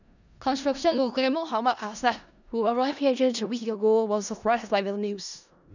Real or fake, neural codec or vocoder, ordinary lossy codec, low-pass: fake; codec, 16 kHz in and 24 kHz out, 0.4 kbps, LongCat-Audio-Codec, four codebook decoder; none; 7.2 kHz